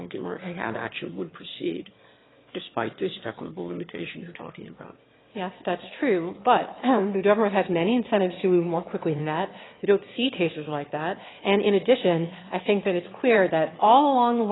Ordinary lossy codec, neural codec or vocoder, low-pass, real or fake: AAC, 16 kbps; autoencoder, 22.05 kHz, a latent of 192 numbers a frame, VITS, trained on one speaker; 7.2 kHz; fake